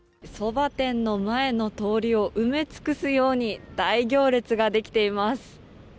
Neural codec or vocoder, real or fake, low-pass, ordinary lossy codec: none; real; none; none